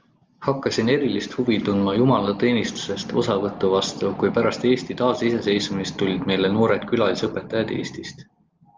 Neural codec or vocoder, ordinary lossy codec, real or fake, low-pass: none; Opus, 32 kbps; real; 7.2 kHz